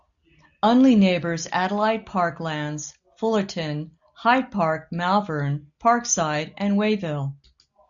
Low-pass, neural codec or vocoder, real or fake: 7.2 kHz; none; real